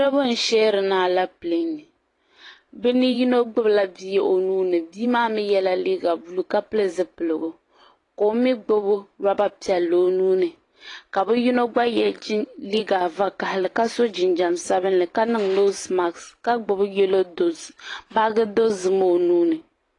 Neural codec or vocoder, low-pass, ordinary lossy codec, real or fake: vocoder, 44.1 kHz, 128 mel bands every 512 samples, BigVGAN v2; 10.8 kHz; AAC, 32 kbps; fake